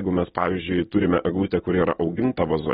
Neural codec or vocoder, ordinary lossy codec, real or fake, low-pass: none; AAC, 16 kbps; real; 7.2 kHz